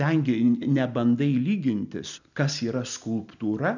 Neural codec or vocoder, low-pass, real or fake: none; 7.2 kHz; real